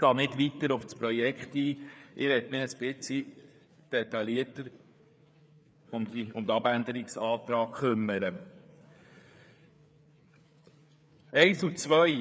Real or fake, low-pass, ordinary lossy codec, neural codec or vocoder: fake; none; none; codec, 16 kHz, 4 kbps, FreqCodec, larger model